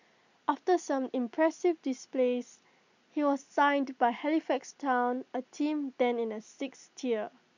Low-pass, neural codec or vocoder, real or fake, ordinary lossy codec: 7.2 kHz; none; real; none